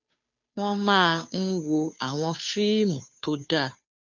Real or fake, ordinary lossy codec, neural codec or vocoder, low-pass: fake; none; codec, 16 kHz, 2 kbps, FunCodec, trained on Chinese and English, 25 frames a second; 7.2 kHz